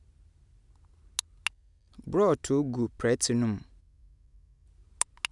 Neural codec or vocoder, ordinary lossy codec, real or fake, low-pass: none; none; real; 10.8 kHz